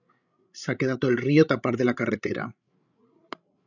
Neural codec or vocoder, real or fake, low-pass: codec, 16 kHz, 16 kbps, FreqCodec, larger model; fake; 7.2 kHz